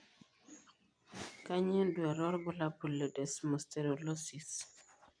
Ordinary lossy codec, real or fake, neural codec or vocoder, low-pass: none; fake; vocoder, 24 kHz, 100 mel bands, Vocos; 9.9 kHz